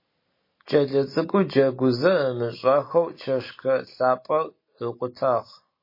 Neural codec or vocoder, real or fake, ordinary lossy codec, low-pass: none; real; MP3, 24 kbps; 5.4 kHz